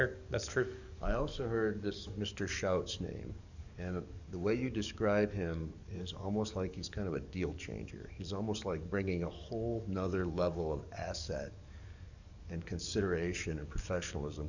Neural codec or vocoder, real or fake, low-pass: codec, 44.1 kHz, 7.8 kbps, DAC; fake; 7.2 kHz